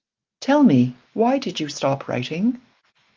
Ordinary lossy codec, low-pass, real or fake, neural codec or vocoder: Opus, 24 kbps; 7.2 kHz; real; none